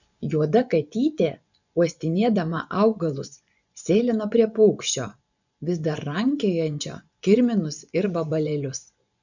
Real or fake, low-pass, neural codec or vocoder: fake; 7.2 kHz; vocoder, 24 kHz, 100 mel bands, Vocos